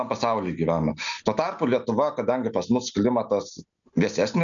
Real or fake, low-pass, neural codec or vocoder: real; 7.2 kHz; none